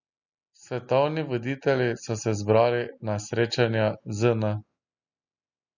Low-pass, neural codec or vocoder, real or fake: 7.2 kHz; none; real